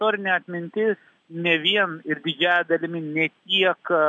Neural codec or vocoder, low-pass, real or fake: none; 9.9 kHz; real